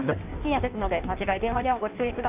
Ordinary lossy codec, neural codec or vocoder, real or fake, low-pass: none; codec, 16 kHz in and 24 kHz out, 1.1 kbps, FireRedTTS-2 codec; fake; 3.6 kHz